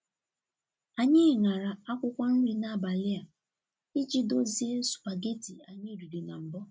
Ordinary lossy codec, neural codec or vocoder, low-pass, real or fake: none; none; none; real